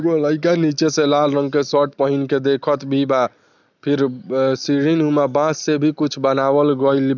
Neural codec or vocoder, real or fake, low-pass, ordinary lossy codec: none; real; 7.2 kHz; none